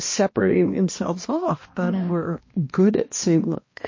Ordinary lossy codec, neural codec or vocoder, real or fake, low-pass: MP3, 32 kbps; codec, 16 kHz, 1 kbps, X-Codec, HuBERT features, trained on balanced general audio; fake; 7.2 kHz